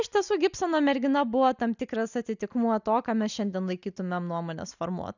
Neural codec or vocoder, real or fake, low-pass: none; real; 7.2 kHz